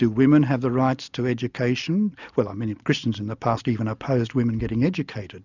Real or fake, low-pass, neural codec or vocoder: real; 7.2 kHz; none